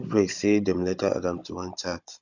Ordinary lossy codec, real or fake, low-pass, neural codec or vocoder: none; fake; 7.2 kHz; codec, 16 kHz, 16 kbps, FunCodec, trained on Chinese and English, 50 frames a second